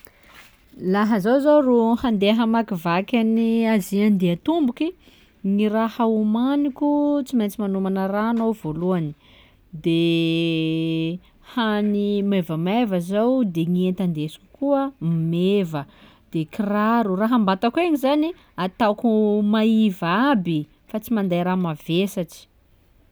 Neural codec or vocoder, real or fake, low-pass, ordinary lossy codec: none; real; none; none